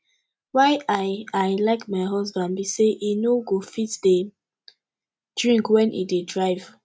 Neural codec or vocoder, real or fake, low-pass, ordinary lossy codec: none; real; none; none